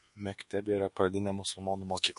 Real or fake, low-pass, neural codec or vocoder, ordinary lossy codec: fake; 10.8 kHz; codec, 24 kHz, 1.2 kbps, DualCodec; MP3, 48 kbps